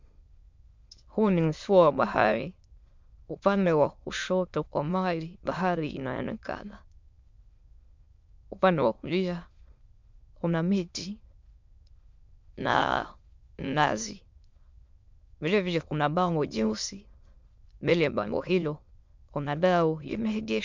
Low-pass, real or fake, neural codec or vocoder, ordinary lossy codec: 7.2 kHz; fake; autoencoder, 22.05 kHz, a latent of 192 numbers a frame, VITS, trained on many speakers; MP3, 64 kbps